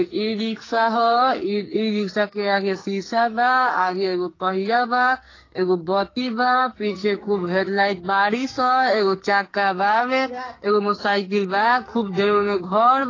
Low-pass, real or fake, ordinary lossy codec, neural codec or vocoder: 7.2 kHz; fake; AAC, 32 kbps; codec, 44.1 kHz, 2.6 kbps, SNAC